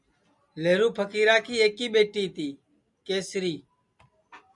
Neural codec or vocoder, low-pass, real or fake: none; 10.8 kHz; real